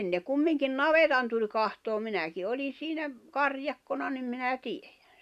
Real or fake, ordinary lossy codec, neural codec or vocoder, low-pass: real; none; none; 14.4 kHz